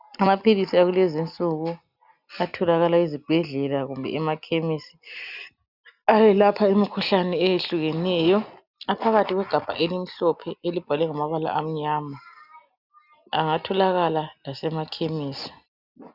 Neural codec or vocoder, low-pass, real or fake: none; 5.4 kHz; real